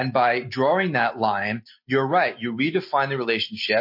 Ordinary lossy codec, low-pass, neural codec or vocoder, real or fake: MP3, 32 kbps; 5.4 kHz; none; real